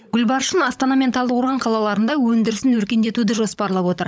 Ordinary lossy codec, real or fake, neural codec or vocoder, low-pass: none; fake; codec, 16 kHz, 16 kbps, FunCodec, trained on LibriTTS, 50 frames a second; none